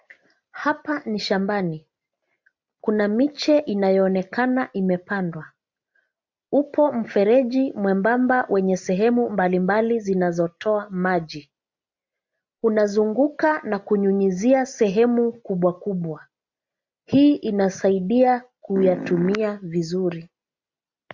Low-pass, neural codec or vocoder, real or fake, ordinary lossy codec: 7.2 kHz; none; real; MP3, 64 kbps